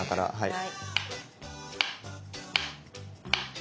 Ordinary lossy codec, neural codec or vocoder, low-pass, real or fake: none; none; none; real